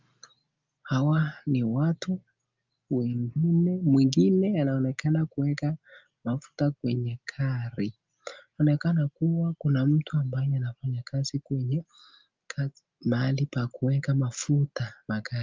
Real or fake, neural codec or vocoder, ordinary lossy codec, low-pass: real; none; Opus, 24 kbps; 7.2 kHz